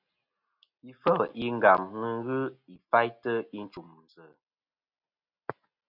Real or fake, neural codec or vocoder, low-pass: real; none; 5.4 kHz